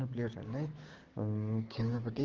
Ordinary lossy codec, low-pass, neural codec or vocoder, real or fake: Opus, 16 kbps; 7.2 kHz; codec, 16 kHz, 6 kbps, DAC; fake